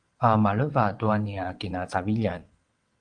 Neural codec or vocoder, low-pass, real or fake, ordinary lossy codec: vocoder, 22.05 kHz, 80 mel bands, WaveNeXt; 9.9 kHz; fake; Opus, 24 kbps